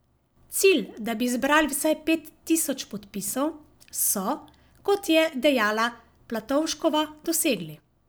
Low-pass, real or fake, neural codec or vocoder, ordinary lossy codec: none; real; none; none